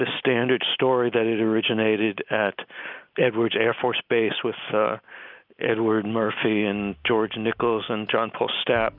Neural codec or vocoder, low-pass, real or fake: none; 5.4 kHz; real